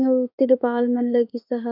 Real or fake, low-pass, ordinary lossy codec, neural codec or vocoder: fake; 5.4 kHz; none; autoencoder, 48 kHz, 32 numbers a frame, DAC-VAE, trained on Japanese speech